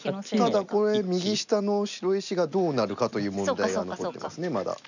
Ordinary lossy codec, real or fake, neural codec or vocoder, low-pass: none; real; none; 7.2 kHz